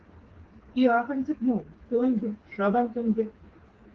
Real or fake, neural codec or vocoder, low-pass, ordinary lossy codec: fake; codec, 16 kHz, 4 kbps, FreqCodec, smaller model; 7.2 kHz; Opus, 16 kbps